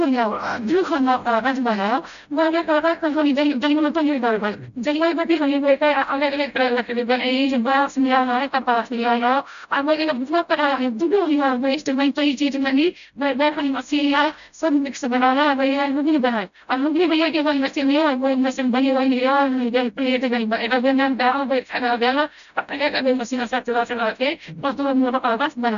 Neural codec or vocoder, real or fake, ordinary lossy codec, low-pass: codec, 16 kHz, 0.5 kbps, FreqCodec, smaller model; fake; none; 7.2 kHz